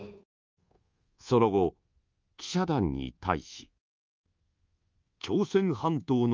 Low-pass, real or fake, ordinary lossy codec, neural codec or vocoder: 7.2 kHz; fake; Opus, 32 kbps; codec, 24 kHz, 1.2 kbps, DualCodec